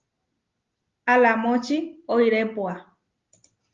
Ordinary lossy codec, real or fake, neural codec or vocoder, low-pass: Opus, 32 kbps; real; none; 7.2 kHz